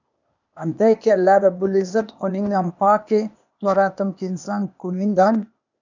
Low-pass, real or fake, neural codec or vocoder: 7.2 kHz; fake; codec, 16 kHz, 0.8 kbps, ZipCodec